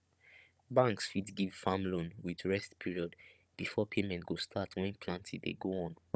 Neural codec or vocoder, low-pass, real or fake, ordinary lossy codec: codec, 16 kHz, 16 kbps, FunCodec, trained on Chinese and English, 50 frames a second; none; fake; none